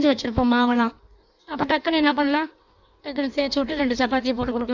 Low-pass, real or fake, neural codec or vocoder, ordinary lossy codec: 7.2 kHz; fake; codec, 16 kHz in and 24 kHz out, 1.1 kbps, FireRedTTS-2 codec; none